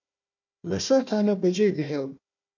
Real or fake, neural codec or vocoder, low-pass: fake; codec, 16 kHz, 1 kbps, FunCodec, trained on Chinese and English, 50 frames a second; 7.2 kHz